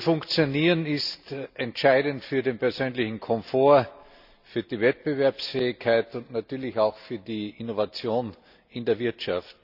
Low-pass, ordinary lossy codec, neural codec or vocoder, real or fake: 5.4 kHz; none; none; real